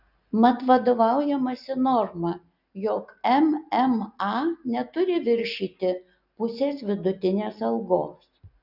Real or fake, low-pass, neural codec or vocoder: real; 5.4 kHz; none